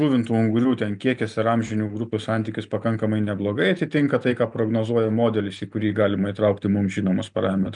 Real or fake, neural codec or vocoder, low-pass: real; none; 9.9 kHz